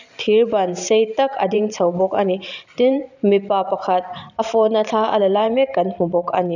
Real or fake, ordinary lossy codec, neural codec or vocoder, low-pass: fake; none; vocoder, 44.1 kHz, 128 mel bands every 512 samples, BigVGAN v2; 7.2 kHz